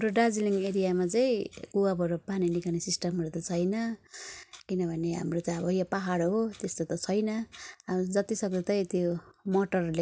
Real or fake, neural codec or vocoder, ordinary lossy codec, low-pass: real; none; none; none